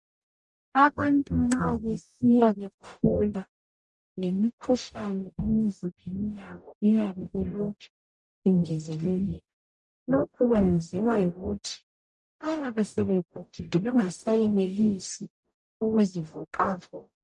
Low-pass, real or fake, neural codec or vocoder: 10.8 kHz; fake; codec, 44.1 kHz, 0.9 kbps, DAC